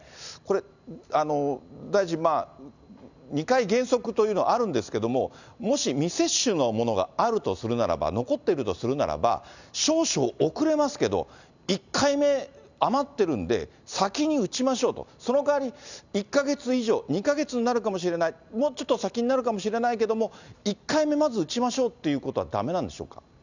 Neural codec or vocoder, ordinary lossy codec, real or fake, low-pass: none; none; real; 7.2 kHz